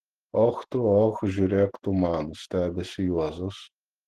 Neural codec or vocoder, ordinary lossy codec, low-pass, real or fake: vocoder, 48 kHz, 128 mel bands, Vocos; Opus, 16 kbps; 14.4 kHz; fake